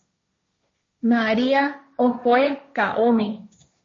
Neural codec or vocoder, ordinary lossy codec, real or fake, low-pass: codec, 16 kHz, 1.1 kbps, Voila-Tokenizer; MP3, 32 kbps; fake; 7.2 kHz